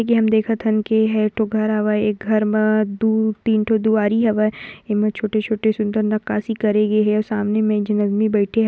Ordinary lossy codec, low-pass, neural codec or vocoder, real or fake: none; none; none; real